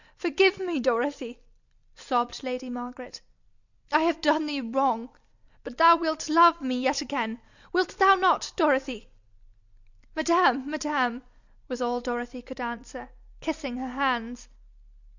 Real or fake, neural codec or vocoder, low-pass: real; none; 7.2 kHz